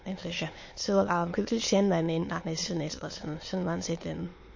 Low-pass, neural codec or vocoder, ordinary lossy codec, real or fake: 7.2 kHz; autoencoder, 22.05 kHz, a latent of 192 numbers a frame, VITS, trained on many speakers; MP3, 32 kbps; fake